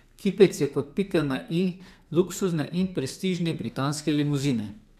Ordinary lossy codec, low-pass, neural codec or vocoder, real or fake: none; 14.4 kHz; codec, 32 kHz, 1.9 kbps, SNAC; fake